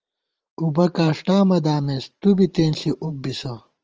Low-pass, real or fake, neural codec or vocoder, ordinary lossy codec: 7.2 kHz; fake; vocoder, 44.1 kHz, 128 mel bands every 512 samples, BigVGAN v2; Opus, 24 kbps